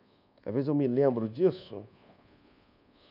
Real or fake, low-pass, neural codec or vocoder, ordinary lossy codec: fake; 5.4 kHz; codec, 24 kHz, 1.2 kbps, DualCodec; none